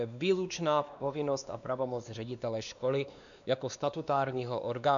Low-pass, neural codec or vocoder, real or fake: 7.2 kHz; codec, 16 kHz, 2 kbps, X-Codec, WavLM features, trained on Multilingual LibriSpeech; fake